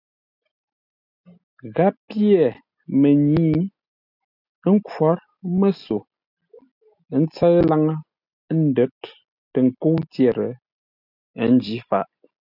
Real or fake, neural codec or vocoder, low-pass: real; none; 5.4 kHz